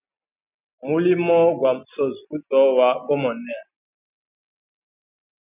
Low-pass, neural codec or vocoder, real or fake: 3.6 kHz; none; real